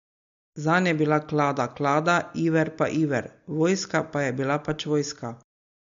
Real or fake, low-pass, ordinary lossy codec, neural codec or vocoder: real; 7.2 kHz; MP3, 48 kbps; none